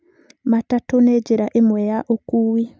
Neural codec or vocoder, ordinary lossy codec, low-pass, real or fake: none; none; none; real